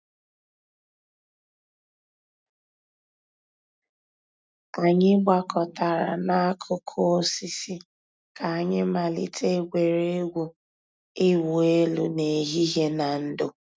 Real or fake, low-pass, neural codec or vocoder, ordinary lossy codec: real; none; none; none